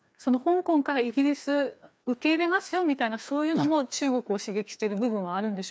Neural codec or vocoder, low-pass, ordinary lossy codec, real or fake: codec, 16 kHz, 2 kbps, FreqCodec, larger model; none; none; fake